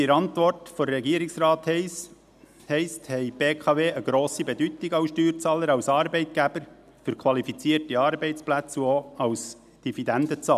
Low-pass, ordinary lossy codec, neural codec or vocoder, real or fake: 14.4 kHz; none; none; real